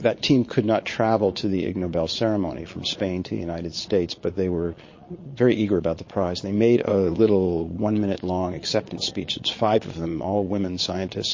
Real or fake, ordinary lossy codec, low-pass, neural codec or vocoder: fake; MP3, 32 kbps; 7.2 kHz; vocoder, 22.05 kHz, 80 mel bands, Vocos